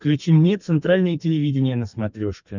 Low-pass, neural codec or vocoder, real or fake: 7.2 kHz; codec, 32 kHz, 1.9 kbps, SNAC; fake